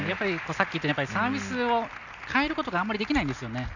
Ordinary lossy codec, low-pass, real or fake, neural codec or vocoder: none; 7.2 kHz; real; none